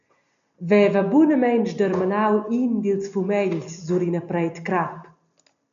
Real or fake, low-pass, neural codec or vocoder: real; 7.2 kHz; none